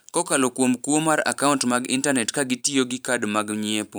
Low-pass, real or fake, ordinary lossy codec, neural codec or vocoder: none; fake; none; vocoder, 44.1 kHz, 128 mel bands every 512 samples, BigVGAN v2